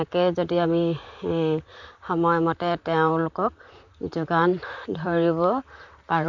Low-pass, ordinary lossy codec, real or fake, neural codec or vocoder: 7.2 kHz; none; fake; vocoder, 44.1 kHz, 128 mel bands, Pupu-Vocoder